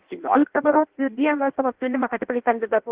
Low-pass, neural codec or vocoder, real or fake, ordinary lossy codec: 3.6 kHz; codec, 16 kHz in and 24 kHz out, 0.6 kbps, FireRedTTS-2 codec; fake; Opus, 16 kbps